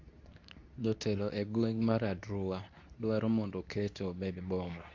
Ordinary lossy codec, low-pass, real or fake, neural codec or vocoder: AAC, 48 kbps; 7.2 kHz; fake; codec, 24 kHz, 0.9 kbps, WavTokenizer, medium speech release version 2